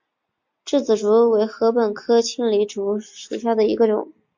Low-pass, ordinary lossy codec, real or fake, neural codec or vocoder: 7.2 kHz; MP3, 48 kbps; real; none